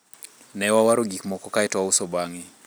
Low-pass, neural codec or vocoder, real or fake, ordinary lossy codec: none; none; real; none